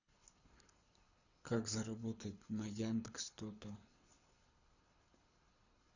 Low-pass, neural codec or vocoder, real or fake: 7.2 kHz; codec, 24 kHz, 6 kbps, HILCodec; fake